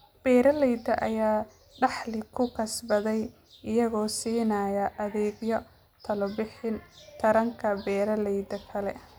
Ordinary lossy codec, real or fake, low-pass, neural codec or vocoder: none; real; none; none